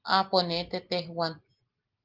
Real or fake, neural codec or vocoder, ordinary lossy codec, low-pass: real; none; Opus, 16 kbps; 5.4 kHz